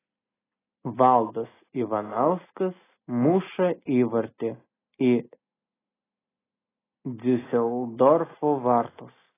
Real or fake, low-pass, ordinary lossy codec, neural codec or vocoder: real; 3.6 kHz; AAC, 16 kbps; none